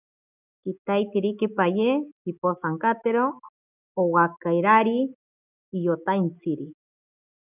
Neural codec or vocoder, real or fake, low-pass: none; real; 3.6 kHz